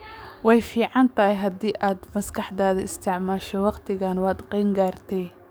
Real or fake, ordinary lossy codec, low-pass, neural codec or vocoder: fake; none; none; codec, 44.1 kHz, 7.8 kbps, DAC